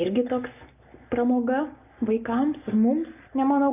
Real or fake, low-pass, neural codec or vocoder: real; 3.6 kHz; none